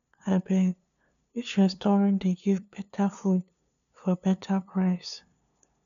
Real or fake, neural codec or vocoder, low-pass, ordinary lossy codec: fake; codec, 16 kHz, 2 kbps, FunCodec, trained on LibriTTS, 25 frames a second; 7.2 kHz; none